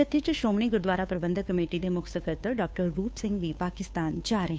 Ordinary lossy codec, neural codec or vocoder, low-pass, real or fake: none; codec, 16 kHz, 2 kbps, FunCodec, trained on Chinese and English, 25 frames a second; none; fake